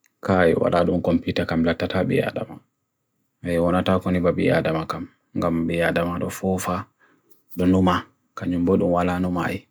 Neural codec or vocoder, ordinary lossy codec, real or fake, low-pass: none; none; real; none